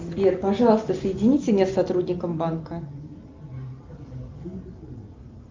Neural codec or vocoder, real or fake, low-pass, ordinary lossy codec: none; real; 7.2 kHz; Opus, 24 kbps